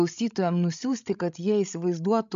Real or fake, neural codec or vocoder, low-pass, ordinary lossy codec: fake; codec, 16 kHz, 16 kbps, FreqCodec, larger model; 7.2 kHz; MP3, 64 kbps